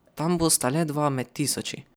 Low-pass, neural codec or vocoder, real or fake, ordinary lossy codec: none; vocoder, 44.1 kHz, 128 mel bands every 256 samples, BigVGAN v2; fake; none